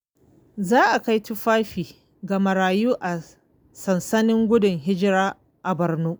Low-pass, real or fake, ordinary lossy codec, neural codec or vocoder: none; real; none; none